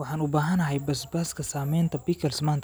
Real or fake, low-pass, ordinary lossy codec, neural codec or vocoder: real; none; none; none